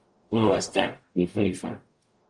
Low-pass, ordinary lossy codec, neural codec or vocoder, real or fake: 10.8 kHz; Opus, 32 kbps; codec, 44.1 kHz, 0.9 kbps, DAC; fake